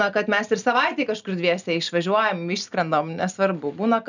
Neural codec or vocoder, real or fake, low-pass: none; real; 7.2 kHz